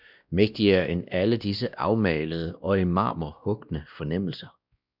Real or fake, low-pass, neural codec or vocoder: fake; 5.4 kHz; codec, 16 kHz, 1 kbps, X-Codec, WavLM features, trained on Multilingual LibriSpeech